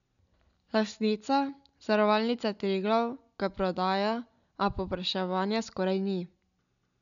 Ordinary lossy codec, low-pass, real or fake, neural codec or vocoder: MP3, 96 kbps; 7.2 kHz; real; none